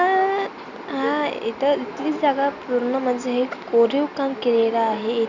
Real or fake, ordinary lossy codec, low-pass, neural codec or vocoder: real; none; 7.2 kHz; none